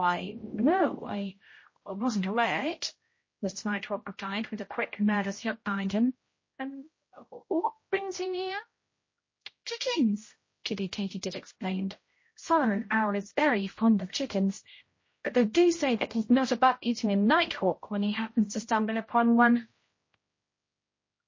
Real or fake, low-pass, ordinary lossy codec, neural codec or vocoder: fake; 7.2 kHz; MP3, 32 kbps; codec, 16 kHz, 0.5 kbps, X-Codec, HuBERT features, trained on general audio